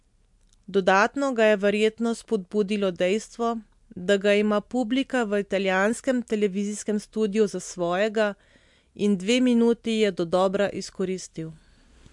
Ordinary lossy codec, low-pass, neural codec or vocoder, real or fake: MP3, 64 kbps; 10.8 kHz; none; real